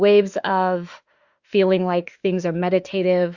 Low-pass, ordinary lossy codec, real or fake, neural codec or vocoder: 7.2 kHz; Opus, 64 kbps; fake; autoencoder, 48 kHz, 32 numbers a frame, DAC-VAE, trained on Japanese speech